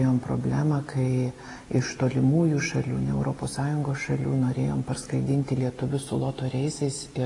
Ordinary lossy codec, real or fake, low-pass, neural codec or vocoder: AAC, 32 kbps; real; 10.8 kHz; none